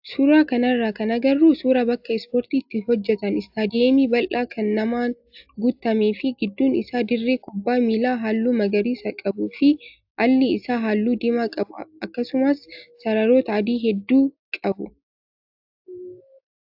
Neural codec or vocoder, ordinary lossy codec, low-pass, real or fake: none; AAC, 48 kbps; 5.4 kHz; real